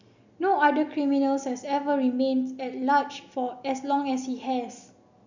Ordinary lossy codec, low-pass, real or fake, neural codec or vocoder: none; 7.2 kHz; real; none